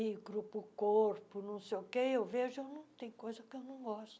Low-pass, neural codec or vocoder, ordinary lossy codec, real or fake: none; none; none; real